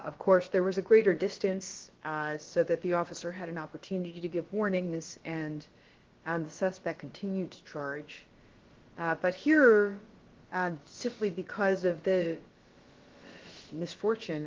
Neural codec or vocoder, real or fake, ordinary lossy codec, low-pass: codec, 16 kHz, about 1 kbps, DyCAST, with the encoder's durations; fake; Opus, 16 kbps; 7.2 kHz